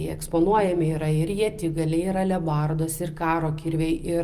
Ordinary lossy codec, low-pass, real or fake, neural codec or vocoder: Opus, 32 kbps; 14.4 kHz; real; none